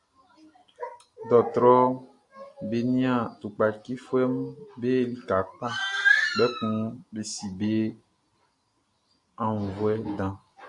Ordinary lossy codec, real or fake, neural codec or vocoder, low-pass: AAC, 64 kbps; real; none; 10.8 kHz